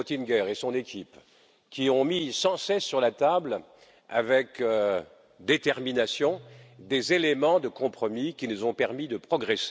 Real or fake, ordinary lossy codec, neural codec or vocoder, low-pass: real; none; none; none